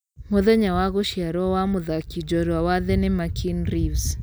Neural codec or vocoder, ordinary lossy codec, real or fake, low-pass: none; none; real; none